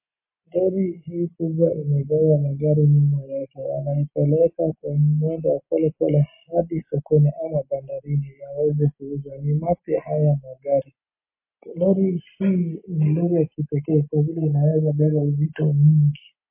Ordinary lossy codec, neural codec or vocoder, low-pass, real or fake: MP3, 24 kbps; none; 3.6 kHz; real